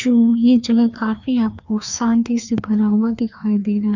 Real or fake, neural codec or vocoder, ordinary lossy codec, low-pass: fake; codec, 16 kHz, 2 kbps, FreqCodec, larger model; none; 7.2 kHz